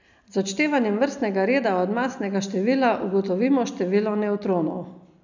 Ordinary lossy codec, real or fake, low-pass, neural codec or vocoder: none; real; 7.2 kHz; none